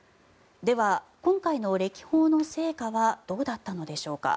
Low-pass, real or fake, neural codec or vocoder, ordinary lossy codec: none; real; none; none